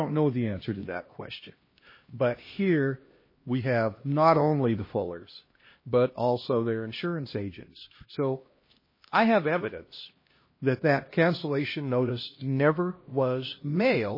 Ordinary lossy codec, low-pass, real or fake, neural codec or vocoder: MP3, 24 kbps; 5.4 kHz; fake; codec, 16 kHz, 1 kbps, X-Codec, HuBERT features, trained on LibriSpeech